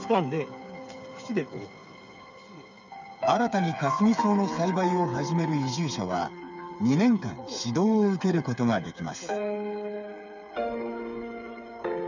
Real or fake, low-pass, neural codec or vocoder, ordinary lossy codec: fake; 7.2 kHz; codec, 16 kHz, 8 kbps, FreqCodec, smaller model; none